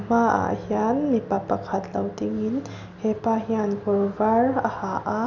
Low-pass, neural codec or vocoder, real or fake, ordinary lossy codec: 7.2 kHz; none; real; none